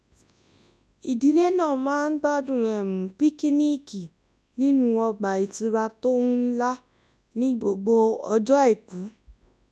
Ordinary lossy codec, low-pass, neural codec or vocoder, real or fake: none; none; codec, 24 kHz, 0.9 kbps, WavTokenizer, large speech release; fake